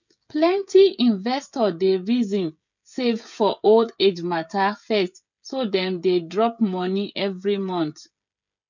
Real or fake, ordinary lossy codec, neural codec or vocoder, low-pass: fake; none; codec, 16 kHz, 16 kbps, FreqCodec, smaller model; 7.2 kHz